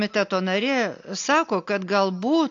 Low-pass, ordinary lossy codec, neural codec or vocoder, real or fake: 7.2 kHz; AAC, 48 kbps; none; real